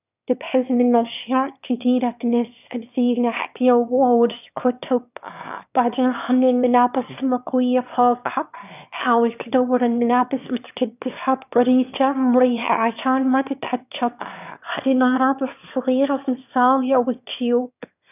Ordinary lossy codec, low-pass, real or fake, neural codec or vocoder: none; 3.6 kHz; fake; autoencoder, 22.05 kHz, a latent of 192 numbers a frame, VITS, trained on one speaker